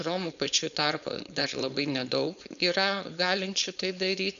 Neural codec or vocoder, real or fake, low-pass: codec, 16 kHz, 4.8 kbps, FACodec; fake; 7.2 kHz